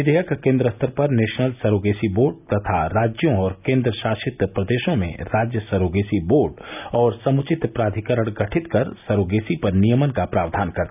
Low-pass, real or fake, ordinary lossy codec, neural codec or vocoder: 3.6 kHz; real; none; none